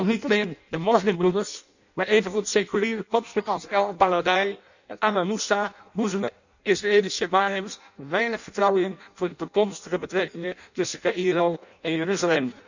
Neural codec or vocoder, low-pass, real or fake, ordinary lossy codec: codec, 16 kHz in and 24 kHz out, 0.6 kbps, FireRedTTS-2 codec; 7.2 kHz; fake; none